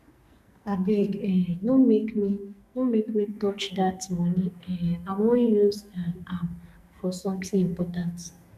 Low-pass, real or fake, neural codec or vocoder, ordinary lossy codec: 14.4 kHz; fake; codec, 44.1 kHz, 2.6 kbps, SNAC; none